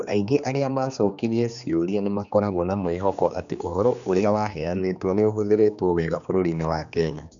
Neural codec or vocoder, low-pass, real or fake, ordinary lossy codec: codec, 16 kHz, 2 kbps, X-Codec, HuBERT features, trained on general audio; 7.2 kHz; fake; none